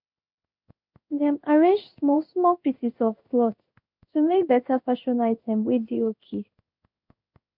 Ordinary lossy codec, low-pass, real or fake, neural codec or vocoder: none; 5.4 kHz; fake; codec, 16 kHz in and 24 kHz out, 1 kbps, XY-Tokenizer